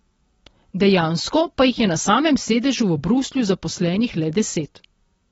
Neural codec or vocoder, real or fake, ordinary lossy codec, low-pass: none; real; AAC, 24 kbps; 19.8 kHz